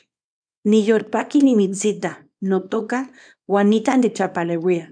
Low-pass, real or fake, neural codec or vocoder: 9.9 kHz; fake; codec, 24 kHz, 0.9 kbps, WavTokenizer, small release